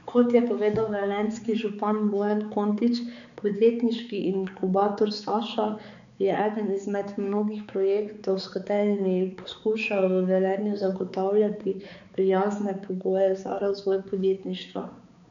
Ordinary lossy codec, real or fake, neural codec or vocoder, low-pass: none; fake; codec, 16 kHz, 4 kbps, X-Codec, HuBERT features, trained on balanced general audio; 7.2 kHz